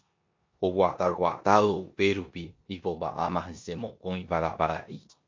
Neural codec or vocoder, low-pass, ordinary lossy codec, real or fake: codec, 16 kHz in and 24 kHz out, 0.9 kbps, LongCat-Audio-Codec, four codebook decoder; 7.2 kHz; MP3, 48 kbps; fake